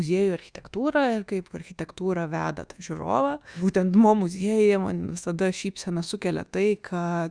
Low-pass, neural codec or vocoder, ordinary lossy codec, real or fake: 9.9 kHz; codec, 24 kHz, 1.2 kbps, DualCodec; Opus, 64 kbps; fake